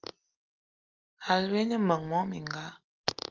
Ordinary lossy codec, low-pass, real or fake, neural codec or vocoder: Opus, 32 kbps; 7.2 kHz; real; none